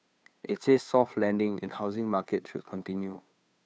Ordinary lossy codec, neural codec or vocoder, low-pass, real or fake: none; codec, 16 kHz, 2 kbps, FunCodec, trained on Chinese and English, 25 frames a second; none; fake